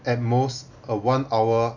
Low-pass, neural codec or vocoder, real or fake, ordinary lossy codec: 7.2 kHz; none; real; none